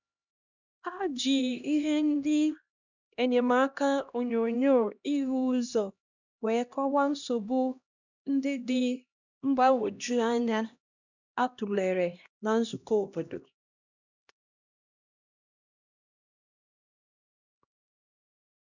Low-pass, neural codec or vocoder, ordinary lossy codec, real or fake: 7.2 kHz; codec, 16 kHz, 1 kbps, X-Codec, HuBERT features, trained on LibriSpeech; none; fake